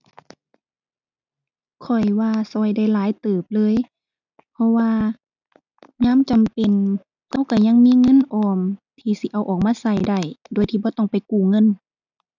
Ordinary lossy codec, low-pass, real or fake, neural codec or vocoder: none; 7.2 kHz; real; none